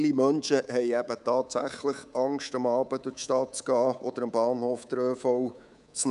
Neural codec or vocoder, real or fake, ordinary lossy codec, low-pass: codec, 24 kHz, 3.1 kbps, DualCodec; fake; none; 10.8 kHz